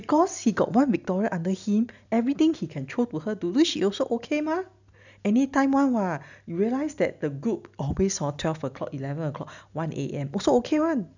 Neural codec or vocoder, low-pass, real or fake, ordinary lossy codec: none; 7.2 kHz; real; none